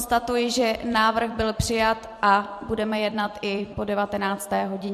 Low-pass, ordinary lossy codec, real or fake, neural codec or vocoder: 14.4 kHz; MP3, 64 kbps; fake; vocoder, 44.1 kHz, 128 mel bands every 256 samples, BigVGAN v2